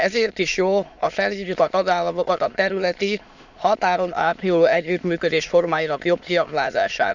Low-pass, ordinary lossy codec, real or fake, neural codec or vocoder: 7.2 kHz; none; fake; autoencoder, 22.05 kHz, a latent of 192 numbers a frame, VITS, trained on many speakers